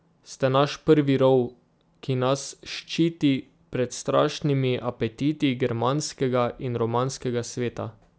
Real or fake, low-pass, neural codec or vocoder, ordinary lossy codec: real; none; none; none